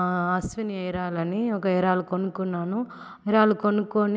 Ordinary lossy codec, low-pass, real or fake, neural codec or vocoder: none; none; real; none